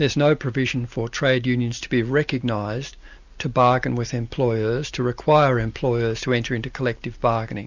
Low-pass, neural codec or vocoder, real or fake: 7.2 kHz; none; real